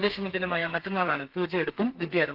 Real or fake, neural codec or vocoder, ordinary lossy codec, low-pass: fake; codec, 32 kHz, 1.9 kbps, SNAC; Opus, 24 kbps; 5.4 kHz